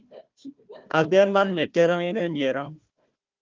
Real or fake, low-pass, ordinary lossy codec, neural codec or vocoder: fake; 7.2 kHz; Opus, 32 kbps; codec, 16 kHz, 1 kbps, FunCodec, trained on Chinese and English, 50 frames a second